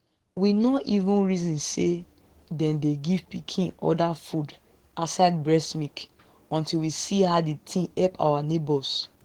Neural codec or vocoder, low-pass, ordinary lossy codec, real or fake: codec, 44.1 kHz, 7.8 kbps, DAC; 19.8 kHz; Opus, 16 kbps; fake